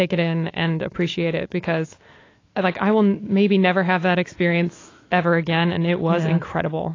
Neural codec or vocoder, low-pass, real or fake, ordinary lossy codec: autoencoder, 48 kHz, 128 numbers a frame, DAC-VAE, trained on Japanese speech; 7.2 kHz; fake; AAC, 32 kbps